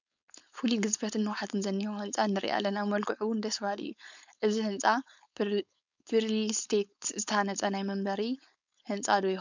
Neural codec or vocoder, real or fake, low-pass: codec, 16 kHz, 4.8 kbps, FACodec; fake; 7.2 kHz